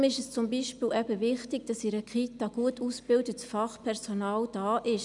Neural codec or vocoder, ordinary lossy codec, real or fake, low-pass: none; none; real; 10.8 kHz